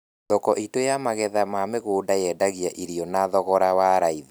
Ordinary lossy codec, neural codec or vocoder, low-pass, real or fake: none; none; none; real